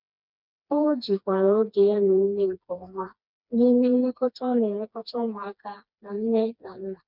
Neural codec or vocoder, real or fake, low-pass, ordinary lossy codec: codec, 16 kHz, 2 kbps, FreqCodec, smaller model; fake; 5.4 kHz; none